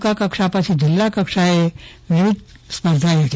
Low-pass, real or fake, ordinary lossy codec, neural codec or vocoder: none; real; none; none